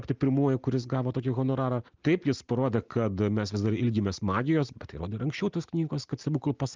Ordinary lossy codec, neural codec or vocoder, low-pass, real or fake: Opus, 24 kbps; none; 7.2 kHz; real